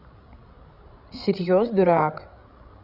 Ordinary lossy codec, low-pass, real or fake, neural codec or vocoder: none; 5.4 kHz; fake; codec, 16 kHz, 16 kbps, FreqCodec, larger model